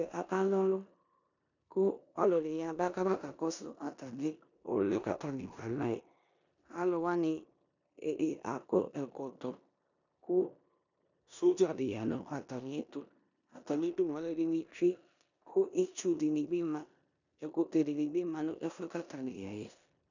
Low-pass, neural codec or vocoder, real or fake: 7.2 kHz; codec, 16 kHz in and 24 kHz out, 0.9 kbps, LongCat-Audio-Codec, four codebook decoder; fake